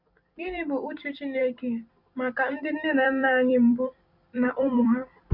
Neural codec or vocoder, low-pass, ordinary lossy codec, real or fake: vocoder, 44.1 kHz, 128 mel bands every 256 samples, BigVGAN v2; 5.4 kHz; Opus, 64 kbps; fake